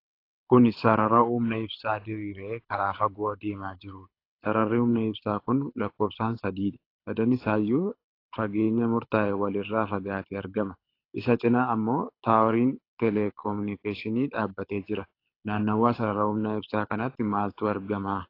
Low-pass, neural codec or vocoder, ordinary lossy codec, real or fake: 5.4 kHz; codec, 24 kHz, 6 kbps, HILCodec; AAC, 32 kbps; fake